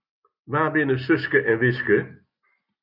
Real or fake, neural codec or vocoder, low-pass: real; none; 5.4 kHz